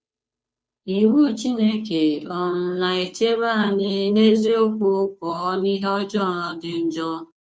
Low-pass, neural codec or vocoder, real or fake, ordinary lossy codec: none; codec, 16 kHz, 2 kbps, FunCodec, trained on Chinese and English, 25 frames a second; fake; none